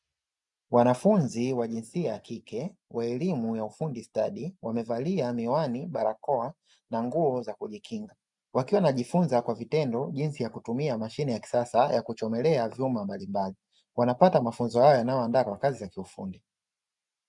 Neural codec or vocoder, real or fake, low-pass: none; real; 10.8 kHz